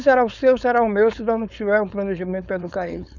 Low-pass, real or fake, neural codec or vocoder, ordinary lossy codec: 7.2 kHz; fake; codec, 16 kHz, 4.8 kbps, FACodec; none